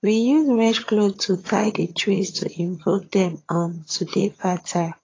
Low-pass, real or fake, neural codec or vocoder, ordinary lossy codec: 7.2 kHz; fake; vocoder, 22.05 kHz, 80 mel bands, HiFi-GAN; AAC, 32 kbps